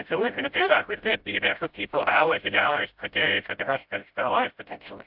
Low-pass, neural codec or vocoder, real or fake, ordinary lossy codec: 5.4 kHz; codec, 16 kHz, 0.5 kbps, FreqCodec, smaller model; fake; AAC, 48 kbps